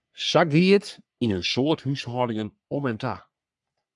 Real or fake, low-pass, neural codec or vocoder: fake; 10.8 kHz; codec, 44.1 kHz, 3.4 kbps, Pupu-Codec